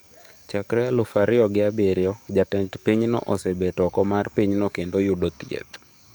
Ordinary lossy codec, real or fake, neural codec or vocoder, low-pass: none; fake; codec, 44.1 kHz, 7.8 kbps, DAC; none